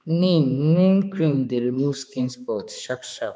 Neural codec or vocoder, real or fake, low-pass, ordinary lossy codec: codec, 16 kHz, 2 kbps, X-Codec, HuBERT features, trained on balanced general audio; fake; none; none